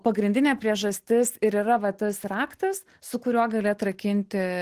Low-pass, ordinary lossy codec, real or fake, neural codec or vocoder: 14.4 kHz; Opus, 16 kbps; real; none